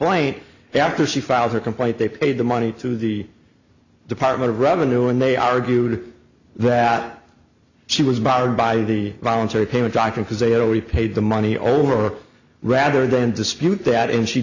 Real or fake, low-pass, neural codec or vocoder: real; 7.2 kHz; none